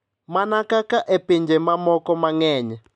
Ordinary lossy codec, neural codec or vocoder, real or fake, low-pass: none; none; real; 10.8 kHz